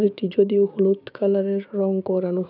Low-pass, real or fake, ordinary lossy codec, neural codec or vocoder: 5.4 kHz; fake; none; codec, 16 kHz, 6 kbps, DAC